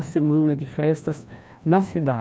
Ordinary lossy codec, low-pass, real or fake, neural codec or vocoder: none; none; fake; codec, 16 kHz, 1 kbps, FreqCodec, larger model